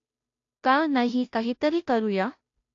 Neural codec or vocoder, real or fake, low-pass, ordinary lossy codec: codec, 16 kHz, 0.5 kbps, FunCodec, trained on Chinese and English, 25 frames a second; fake; 7.2 kHz; AAC, 48 kbps